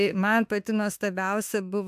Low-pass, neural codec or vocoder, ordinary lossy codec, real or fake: 14.4 kHz; autoencoder, 48 kHz, 32 numbers a frame, DAC-VAE, trained on Japanese speech; AAC, 96 kbps; fake